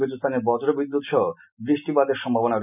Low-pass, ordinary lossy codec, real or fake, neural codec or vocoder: 3.6 kHz; none; real; none